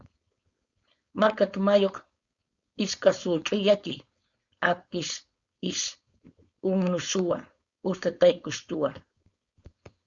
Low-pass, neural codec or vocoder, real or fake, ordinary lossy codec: 7.2 kHz; codec, 16 kHz, 4.8 kbps, FACodec; fake; Opus, 64 kbps